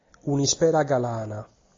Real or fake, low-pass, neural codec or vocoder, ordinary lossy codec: real; 7.2 kHz; none; AAC, 32 kbps